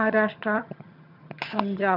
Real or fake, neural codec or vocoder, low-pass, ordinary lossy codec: fake; vocoder, 22.05 kHz, 80 mel bands, HiFi-GAN; 5.4 kHz; none